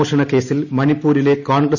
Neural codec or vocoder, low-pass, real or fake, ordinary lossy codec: none; 7.2 kHz; real; none